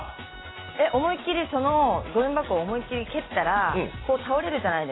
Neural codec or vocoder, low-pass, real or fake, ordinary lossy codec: none; 7.2 kHz; real; AAC, 16 kbps